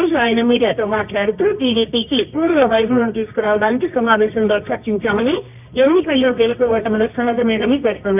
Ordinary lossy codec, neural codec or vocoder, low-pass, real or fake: none; codec, 24 kHz, 0.9 kbps, WavTokenizer, medium music audio release; 3.6 kHz; fake